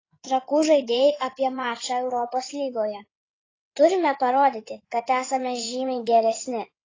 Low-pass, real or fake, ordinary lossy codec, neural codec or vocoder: 7.2 kHz; fake; AAC, 32 kbps; codec, 16 kHz in and 24 kHz out, 2.2 kbps, FireRedTTS-2 codec